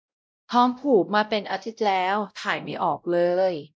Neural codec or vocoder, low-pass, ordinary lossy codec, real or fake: codec, 16 kHz, 0.5 kbps, X-Codec, WavLM features, trained on Multilingual LibriSpeech; none; none; fake